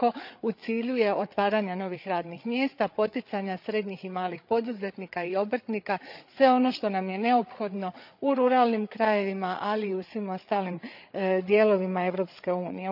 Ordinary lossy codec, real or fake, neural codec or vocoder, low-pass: none; fake; codec, 16 kHz, 8 kbps, FreqCodec, larger model; 5.4 kHz